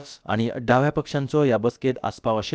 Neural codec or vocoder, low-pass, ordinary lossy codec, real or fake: codec, 16 kHz, about 1 kbps, DyCAST, with the encoder's durations; none; none; fake